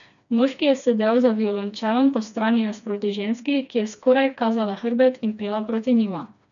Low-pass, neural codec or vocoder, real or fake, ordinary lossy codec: 7.2 kHz; codec, 16 kHz, 2 kbps, FreqCodec, smaller model; fake; none